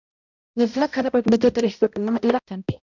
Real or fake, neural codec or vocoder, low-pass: fake; codec, 16 kHz, 0.5 kbps, X-Codec, HuBERT features, trained on balanced general audio; 7.2 kHz